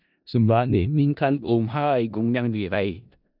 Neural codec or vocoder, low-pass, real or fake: codec, 16 kHz in and 24 kHz out, 0.4 kbps, LongCat-Audio-Codec, four codebook decoder; 5.4 kHz; fake